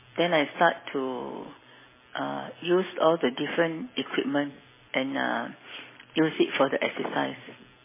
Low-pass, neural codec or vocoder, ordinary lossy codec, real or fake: 3.6 kHz; none; MP3, 16 kbps; real